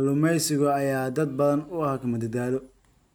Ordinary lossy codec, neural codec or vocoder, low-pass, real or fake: none; none; none; real